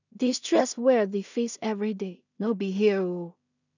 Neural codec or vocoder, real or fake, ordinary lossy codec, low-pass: codec, 16 kHz in and 24 kHz out, 0.4 kbps, LongCat-Audio-Codec, two codebook decoder; fake; none; 7.2 kHz